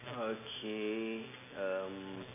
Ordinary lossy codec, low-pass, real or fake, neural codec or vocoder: none; 3.6 kHz; real; none